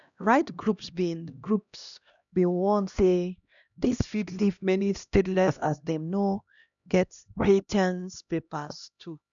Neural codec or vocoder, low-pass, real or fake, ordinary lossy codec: codec, 16 kHz, 1 kbps, X-Codec, HuBERT features, trained on LibriSpeech; 7.2 kHz; fake; none